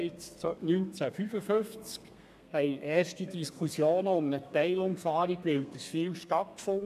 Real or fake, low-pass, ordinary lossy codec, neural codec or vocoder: fake; 14.4 kHz; none; codec, 32 kHz, 1.9 kbps, SNAC